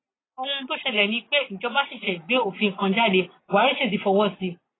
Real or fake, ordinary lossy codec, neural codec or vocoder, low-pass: real; AAC, 16 kbps; none; 7.2 kHz